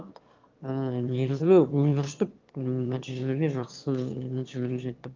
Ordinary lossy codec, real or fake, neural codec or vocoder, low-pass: Opus, 24 kbps; fake; autoencoder, 22.05 kHz, a latent of 192 numbers a frame, VITS, trained on one speaker; 7.2 kHz